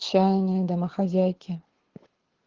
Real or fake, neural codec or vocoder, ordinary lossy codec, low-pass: fake; codec, 24 kHz, 6 kbps, HILCodec; Opus, 16 kbps; 7.2 kHz